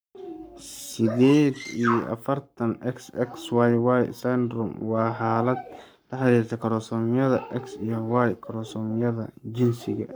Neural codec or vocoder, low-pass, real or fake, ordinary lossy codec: codec, 44.1 kHz, 7.8 kbps, Pupu-Codec; none; fake; none